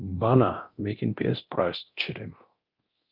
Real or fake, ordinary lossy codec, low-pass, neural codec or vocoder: fake; Opus, 24 kbps; 5.4 kHz; codec, 24 kHz, 0.9 kbps, DualCodec